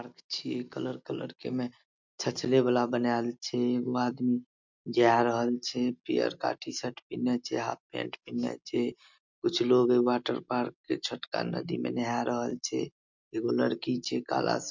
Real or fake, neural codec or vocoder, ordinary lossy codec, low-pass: real; none; MP3, 64 kbps; 7.2 kHz